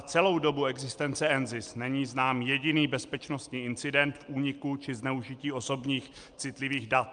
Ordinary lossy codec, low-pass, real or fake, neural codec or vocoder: Opus, 32 kbps; 9.9 kHz; real; none